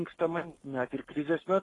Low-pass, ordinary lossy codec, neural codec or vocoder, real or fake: 10.8 kHz; AAC, 32 kbps; codec, 44.1 kHz, 7.8 kbps, Pupu-Codec; fake